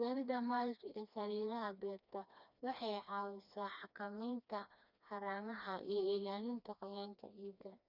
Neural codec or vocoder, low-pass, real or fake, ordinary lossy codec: codec, 16 kHz, 2 kbps, FreqCodec, smaller model; 5.4 kHz; fake; none